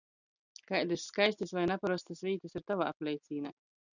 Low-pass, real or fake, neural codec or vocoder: 7.2 kHz; real; none